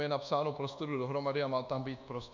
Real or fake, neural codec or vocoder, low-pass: fake; codec, 24 kHz, 1.2 kbps, DualCodec; 7.2 kHz